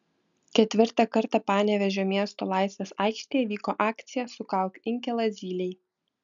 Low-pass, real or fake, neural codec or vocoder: 7.2 kHz; real; none